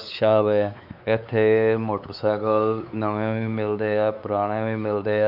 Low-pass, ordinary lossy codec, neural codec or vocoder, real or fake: 5.4 kHz; none; codec, 16 kHz, 4 kbps, X-Codec, WavLM features, trained on Multilingual LibriSpeech; fake